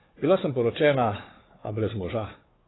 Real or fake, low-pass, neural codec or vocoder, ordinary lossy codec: fake; 7.2 kHz; codec, 16 kHz, 4 kbps, FunCodec, trained on Chinese and English, 50 frames a second; AAC, 16 kbps